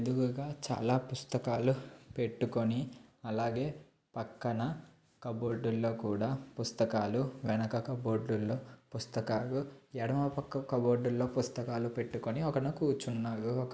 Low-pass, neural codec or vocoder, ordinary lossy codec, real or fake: none; none; none; real